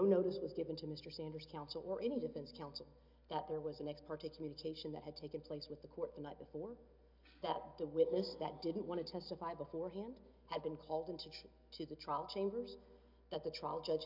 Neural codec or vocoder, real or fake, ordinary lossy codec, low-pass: none; real; MP3, 48 kbps; 5.4 kHz